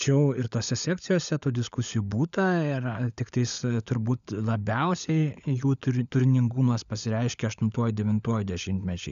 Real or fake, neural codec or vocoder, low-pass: fake; codec, 16 kHz, 16 kbps, FunCodec, trained on LibriTTS, 50 frames a second; 7.2 kHz